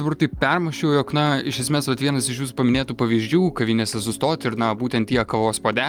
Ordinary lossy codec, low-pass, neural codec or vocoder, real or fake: Opus, 32 kbps; 19.8 kHz; none; real